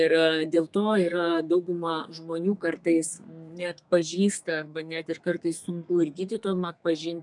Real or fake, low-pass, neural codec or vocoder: fake; 10.8 kHz; codec, 32 kHz, 1.9 kbps, SNAC